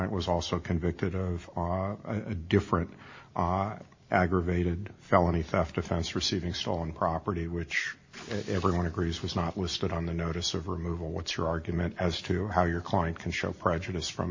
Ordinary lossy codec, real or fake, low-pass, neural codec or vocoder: MP3, 32 kbps; real; 7.2 kHz; none